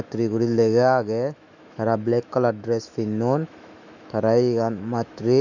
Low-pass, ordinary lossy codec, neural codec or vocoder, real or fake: 7.2 kHz; none; autoencoder, 48 kHz, 128 numbers a frame, DAC-VAE, trained on Japanese speech; fake